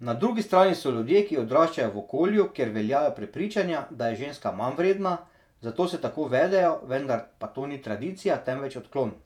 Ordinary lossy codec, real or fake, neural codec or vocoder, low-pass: none; fake; vocoder, 48 kHz, 128 mel bands, Vocos; 19.8 kHz